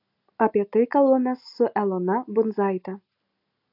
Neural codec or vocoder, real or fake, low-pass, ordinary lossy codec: none; real; 5.4 kHz; AAC, 48 kbps